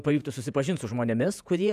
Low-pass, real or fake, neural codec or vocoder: 14.4 kHz; fake; vocoder, 48 kHz, 128 mel bands, Vocos